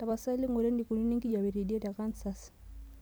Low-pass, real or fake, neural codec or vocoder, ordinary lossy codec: none; real; none; none